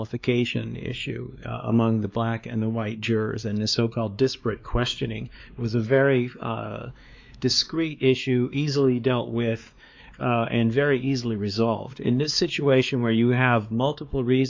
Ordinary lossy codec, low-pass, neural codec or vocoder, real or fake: MP3, 64 kbps; 7.2 kHz; codec, 16 kHz, 2 kbps, X-Codec, WavLM features, trained on Multilingual LibriSpeech; fake